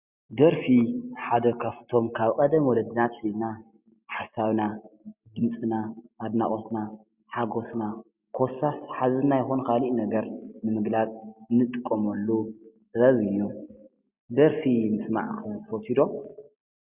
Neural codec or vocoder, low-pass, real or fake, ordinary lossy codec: none; 3.6 kHz; real; Opus, 64 kbps